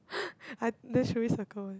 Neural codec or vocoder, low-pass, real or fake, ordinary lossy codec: none; none; real; none